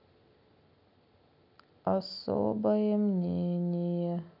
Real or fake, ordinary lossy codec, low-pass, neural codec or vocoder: real; none; 5.4 kHz; none